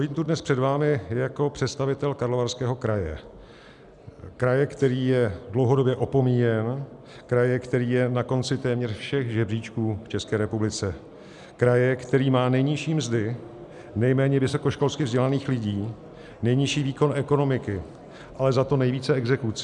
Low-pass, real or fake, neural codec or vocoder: 10.8 kHz; real; none